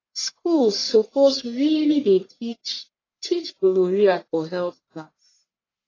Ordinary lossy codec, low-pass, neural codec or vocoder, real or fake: AAC, 32 kbps; 7.2 kHz; codec, 44.1 kHz, 1.7 kbps, Pupu-Codec; fake